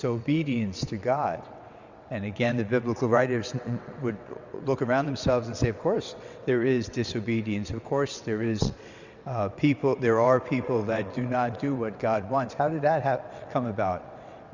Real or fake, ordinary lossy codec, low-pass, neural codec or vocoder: fake; Opus, 64 kbps; 7.2 kHz; vocoder, 22.05 kHz, 80 mel bands, WaveNeXt